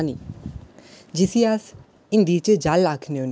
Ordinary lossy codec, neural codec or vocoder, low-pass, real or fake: none; none; none; real